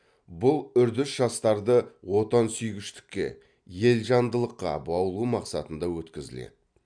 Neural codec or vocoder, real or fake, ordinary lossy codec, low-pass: none; real; MP3, 96 kbps; 9.9 kHz